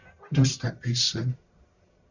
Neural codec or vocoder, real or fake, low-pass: codec, 44.1 kHz, 1.7 kbps, Pupu-Codec; fake; 7.2 kHz